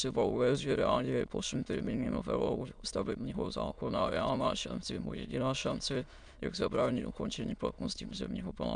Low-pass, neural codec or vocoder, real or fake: 9.9 kHz; autoencoder, 22.05 kHz, a latent of 192 numbers a frame, VITS, trained on many speakers; fake